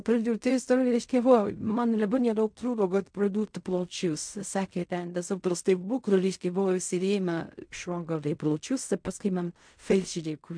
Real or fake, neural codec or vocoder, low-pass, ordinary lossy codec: fake; codec, 16 kHz in and 24 kHz out, 0.4 kbps, LongCat-Audio-Codec, fine tuned four codebook decoder; 9.9 kHz; MP3, 64 kbps